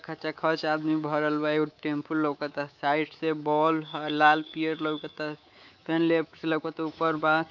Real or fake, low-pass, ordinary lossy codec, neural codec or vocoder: fake; 7.2 kHz; none; codec, 24 kHz, 3.1 kbps, DualCodec